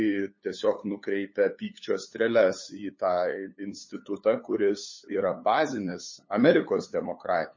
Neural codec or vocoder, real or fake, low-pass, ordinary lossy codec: codec, 16 kHz, 8 kbps, FunCodec, trained on LibriTTS, 25 frames a second; fake; 7.2 kHz; MP3, 32 kbps